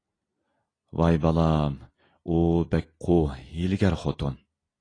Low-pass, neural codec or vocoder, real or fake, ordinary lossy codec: 9.9 kHz; none; real; AAC, 32 kbps